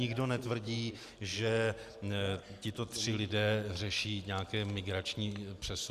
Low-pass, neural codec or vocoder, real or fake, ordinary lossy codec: 14.4 kHz; none; real; Opus, 64 kbps